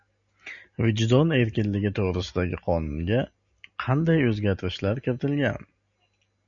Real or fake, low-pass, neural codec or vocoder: real; 7.2 kHz; none